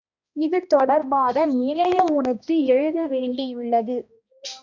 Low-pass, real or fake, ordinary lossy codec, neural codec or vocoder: 7.2 kHz; fake; Opus, 64 kbps; codec, 16 kHz, 1 kbps, X-Codec, HuBERT features, trained on balanced general audio